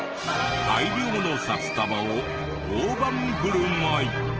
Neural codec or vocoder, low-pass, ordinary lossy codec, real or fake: none; 7.2 kHz; Opus, 16 kbps; real